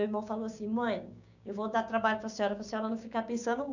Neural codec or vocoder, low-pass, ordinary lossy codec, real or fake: codec, 16 kHz, 6 kbps, DAC; 7.2 kHz; none; fake